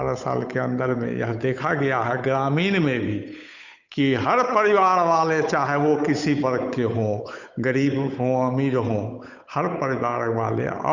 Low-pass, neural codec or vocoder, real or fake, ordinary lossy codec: 7.2 kHz; codec, 16 kHz, 8 kbps, FunCodec, trained on Chinese and English, 25 frames a second; fake; none